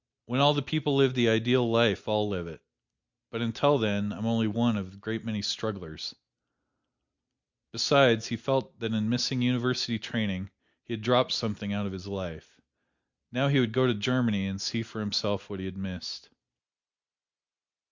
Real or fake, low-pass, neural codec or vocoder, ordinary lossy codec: real; 7.2 kHz; none; Opus, 64 kbps